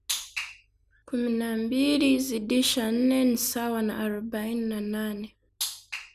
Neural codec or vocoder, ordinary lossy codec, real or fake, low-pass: none; none; real; 14.4 kHz